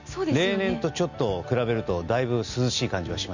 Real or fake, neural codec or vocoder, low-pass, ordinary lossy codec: real; none; 7.2 kHz; none